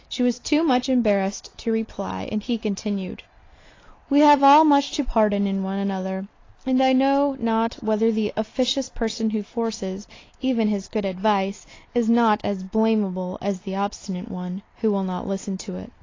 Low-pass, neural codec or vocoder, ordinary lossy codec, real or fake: 7.2 kHz; none; AAC, 32 kbps; real